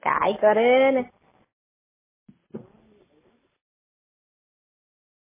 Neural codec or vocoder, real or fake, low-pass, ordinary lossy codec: none; real; 3.6 kHz; MP3, 16 kbps